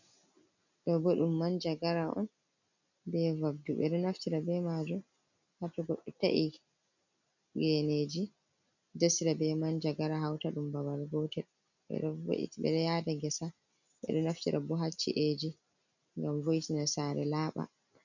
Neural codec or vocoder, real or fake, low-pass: none; real; 7.2 kHz